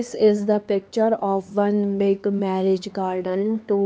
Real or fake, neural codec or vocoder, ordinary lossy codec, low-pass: fake; codec, 16 kHz, 2 kbps, X-Codec, HuBERT features, trained on LibriSpeech; none; none